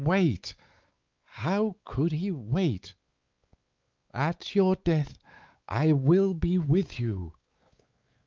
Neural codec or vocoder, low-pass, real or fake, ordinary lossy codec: codec, 16 kHz, 4 kbps, X-Codec, WavLM features, trained on Multilingual LibriSpeech; 7.2 kHz; fake; Opus, 24 kbps